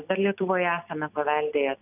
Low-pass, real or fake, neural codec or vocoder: 3.6 kHz; real; none